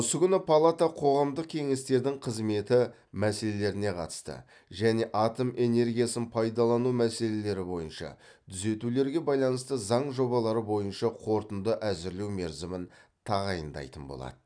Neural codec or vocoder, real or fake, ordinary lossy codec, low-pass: none; real; none; 9.9 kHz